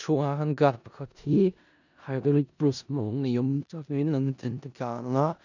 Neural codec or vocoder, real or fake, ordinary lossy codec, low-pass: codec, 16 kHz in and 24 kHz out, 0.4 kbps, LongCat-Audio-Codec, four codebook decoder; fake; none; 7.2 kHz